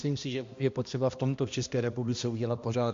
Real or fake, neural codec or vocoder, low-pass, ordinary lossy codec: fake; codec, 16 kHz, 1 kbps, X-Codec, HuBERT features, trained on balanced general audio; 7.2 kHz; MP3, 48 kbps